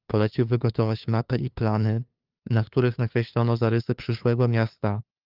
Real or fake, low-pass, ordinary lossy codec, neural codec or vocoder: fake; 5.4 kHz; Opus, 32 kbps; codec, 16 kHz, 2 kbps, FunCodec, trained on LibriTTS, 25 frames a second